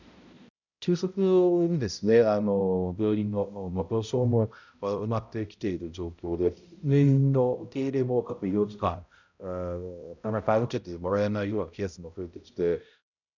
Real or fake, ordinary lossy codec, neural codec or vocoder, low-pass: fake; none; codec, 16 kHz, 0.5 kbps, X-Codec, HuBERT features, trained on balanced general audio; 7.2 kHz